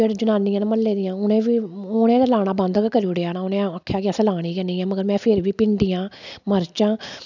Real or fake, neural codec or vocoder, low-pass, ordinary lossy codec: real; none; 7.2 kHz; none